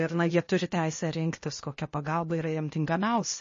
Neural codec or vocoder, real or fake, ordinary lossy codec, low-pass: codec, 16 kHz, 0.8 kbps, ZipCodec; fake; MP3, 32 kbps; 7.2 kHz